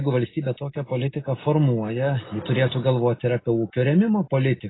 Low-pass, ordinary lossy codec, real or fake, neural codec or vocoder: 7.2 kHz; AAC, 16 kbps; real; none